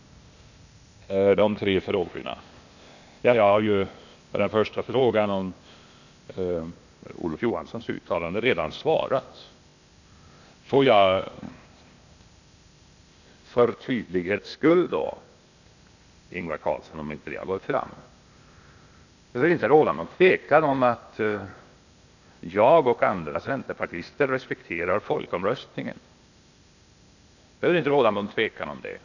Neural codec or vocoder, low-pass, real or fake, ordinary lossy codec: codec, 16 kHz, 0.8 kbps, ZipCodec; 7.2 kHz; fake; none